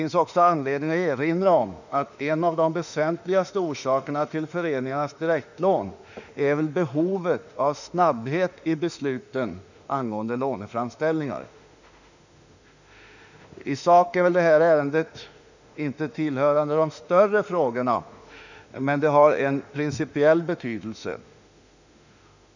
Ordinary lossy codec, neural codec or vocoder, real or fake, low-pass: none; autoencoder, 48 kHz, 32 numbers a frame, DAC-VAE, trained on Japanese speech; fake; 7.2 kHz